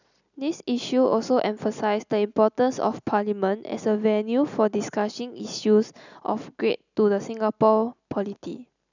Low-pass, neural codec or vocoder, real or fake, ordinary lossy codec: 7.2 kHz; none; real; none